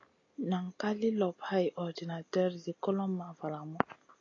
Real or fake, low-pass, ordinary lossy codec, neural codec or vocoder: real; 7.2 kHz; AAC, 32 kbps; none